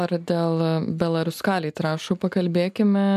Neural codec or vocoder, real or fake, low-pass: none; real; 14.4 kHz